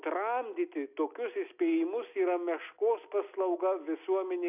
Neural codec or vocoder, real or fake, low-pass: none; real; 3.6 kHz